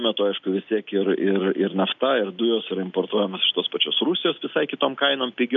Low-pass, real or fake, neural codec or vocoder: 7.2 kHz; real; none